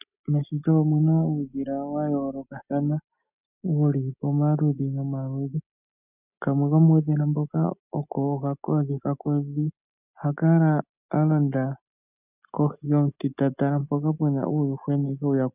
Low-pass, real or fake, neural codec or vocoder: 3.6 kHz; real; none